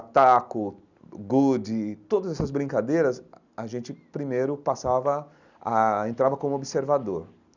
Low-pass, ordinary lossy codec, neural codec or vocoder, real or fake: 7.2 kHz; none; none; real